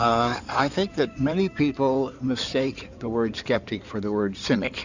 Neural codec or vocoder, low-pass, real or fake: codec, 16 kHz in and 24 kHz out, 2.2 kbps, FireRedTTS-2 codec; 7.2 kHz; fake